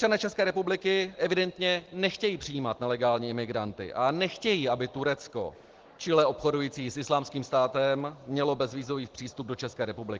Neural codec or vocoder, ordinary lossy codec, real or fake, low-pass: none; Opus, 16 kbps; real; 7.2 kHz